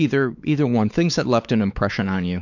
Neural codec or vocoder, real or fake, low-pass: codec, 16 kHz, 4 kbps, X-Codec, WavLM features, trained on Multilingual LibriSpeech; fake; 7.2 kHz